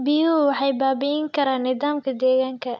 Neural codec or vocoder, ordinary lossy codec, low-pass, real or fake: none; none; none; real